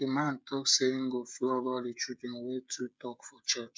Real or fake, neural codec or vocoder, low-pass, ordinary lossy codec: fake; codec, 16 kHz, 16 kbps, FreqCodec, smaller model; none; none